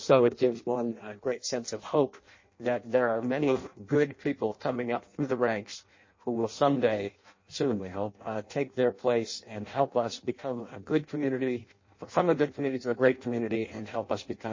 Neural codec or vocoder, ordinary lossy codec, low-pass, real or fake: codec, 16 kHz in and 24 kHz out, 0.6 kbps, FireRedTTS-2 codec; MP3, 32 kbps; 7.2 kHz; fake